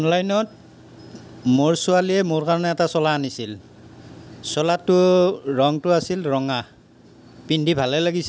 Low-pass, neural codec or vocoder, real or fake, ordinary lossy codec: none; none; real; none